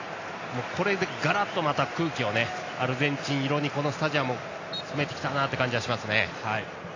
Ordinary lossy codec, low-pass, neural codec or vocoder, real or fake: AAC, 32 kbps; 7.2 kHz; none; real